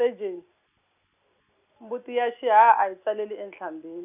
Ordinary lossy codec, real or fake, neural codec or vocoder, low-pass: none; real; none; 3.6 kHz